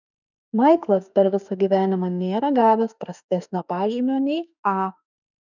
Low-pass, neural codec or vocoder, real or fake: 7.2 kHz; autoencoder, 48 kHz, 32 numbers a frame, DAC-VAE, trained on Japanese speech; fake